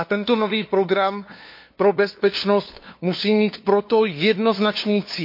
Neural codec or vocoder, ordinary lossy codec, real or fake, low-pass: codec, 16 kHz, 2 kbps, FunCodec, trained on LibriTTS, 25 frames a second; MP3, 32 kbps; fake; 5.4 kHz